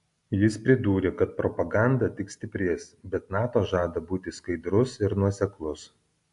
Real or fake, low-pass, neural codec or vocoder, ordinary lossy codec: real; 10.8 kHz; none; AAC, 64 kbps